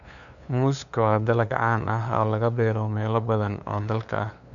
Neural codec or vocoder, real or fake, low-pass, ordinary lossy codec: codec, 16 kHz, 2 kbps, FunCodec, trained on LibriTTS, 25 frames a second; fake; 7.2 kHz; none